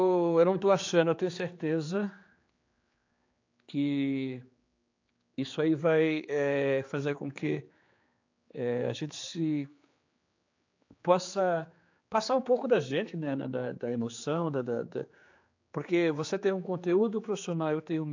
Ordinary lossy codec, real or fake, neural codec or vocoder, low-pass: AAC, 48 kbps; fake; codec, 16 kHz, 4 kbps, X-Codec, HuBERT features, trained on general audio; 7.2 kHz